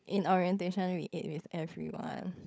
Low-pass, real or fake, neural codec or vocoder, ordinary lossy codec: none; fake; codec, 16 kHz, 16 kbps, FunCodec, trained on LibriTTS, 50 frames a second; none